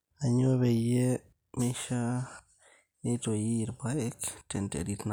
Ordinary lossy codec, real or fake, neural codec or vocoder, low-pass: none; real; none; none